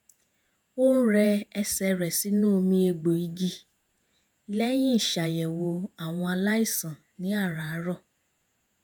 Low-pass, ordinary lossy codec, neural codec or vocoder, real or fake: none; none; vocoder, 48 kHz, 128 mel bands, Vocos; fake